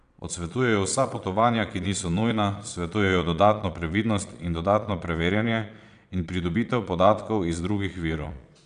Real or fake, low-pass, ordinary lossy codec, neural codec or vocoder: fake; 9.9 kHz; none; vocoder, 22.05 kHz, 80 mel bands, Vocos